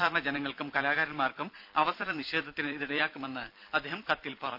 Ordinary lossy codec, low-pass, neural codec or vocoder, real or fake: none; 5.4 kHz; vocoder, 44.1 kHz, 128 mel bands every 256 samples, BigVGAN v2; fake